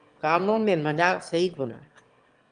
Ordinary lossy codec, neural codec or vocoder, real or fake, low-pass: Opus, 32 kbps; autoencoder, 22.05 kHz, a latent of 192 numbers a frame, VITS, trained on one speaker; fake; 9.9 kHz